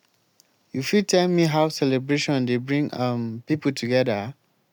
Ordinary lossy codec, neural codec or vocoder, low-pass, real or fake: none; none; 19.8 kHz; real